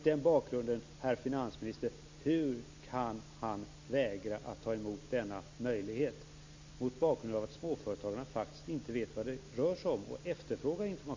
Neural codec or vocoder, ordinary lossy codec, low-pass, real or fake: none; MP3, 64 kbps; 7.2 kHz; real